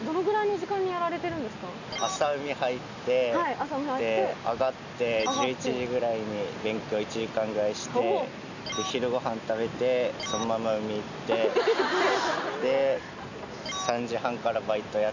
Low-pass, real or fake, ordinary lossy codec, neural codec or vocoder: 7.2 kHz; real; none; none